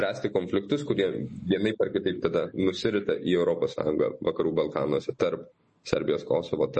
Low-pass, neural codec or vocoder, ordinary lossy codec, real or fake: 10.8 kHz; none; MP3, 32 kbps; real